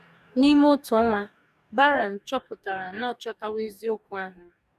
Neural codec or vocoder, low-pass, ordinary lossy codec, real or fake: codec, 44.1 kHz, 2.6 kbps, DAC; 14.4 kHz; none; fake